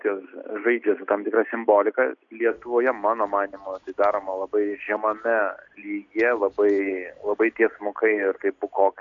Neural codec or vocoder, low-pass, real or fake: none; 7.2 kHz; real